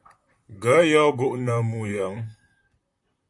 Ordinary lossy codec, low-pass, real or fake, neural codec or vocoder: AAC, 64 kbps; 10.8 kHz; fake; vocoder, 44.1 kHz, 128 mel bands, Pupu-Vocoder